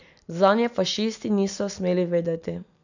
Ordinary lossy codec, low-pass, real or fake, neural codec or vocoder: none; 7.2 kHz; real; none